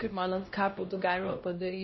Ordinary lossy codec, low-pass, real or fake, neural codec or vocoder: MP3, 24 kbps; 7.2 kHz; fake; codec, 16 kHz, 1 kbps, X-Codec, HuBERT features, trained on LibriSpeech